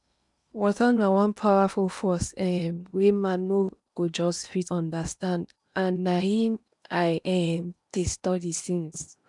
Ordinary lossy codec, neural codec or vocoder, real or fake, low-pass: none; codec, 16 kHz in and 24 kHz out, 0.8 kbps, FocalCodec, streaming, 65536 codes; fake; 10.8 kHz